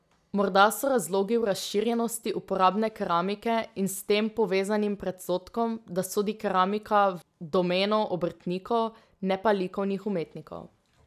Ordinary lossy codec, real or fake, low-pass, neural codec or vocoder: none; real; 14.4 kHz; none